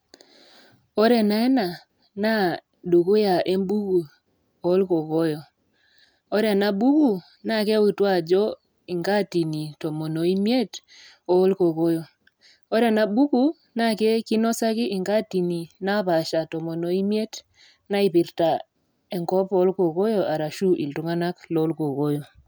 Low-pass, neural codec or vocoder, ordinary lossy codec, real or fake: none; none; none; real